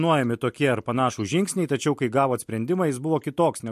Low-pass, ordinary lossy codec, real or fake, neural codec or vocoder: 14.4 kHz; MP3, 64 kbps; real; none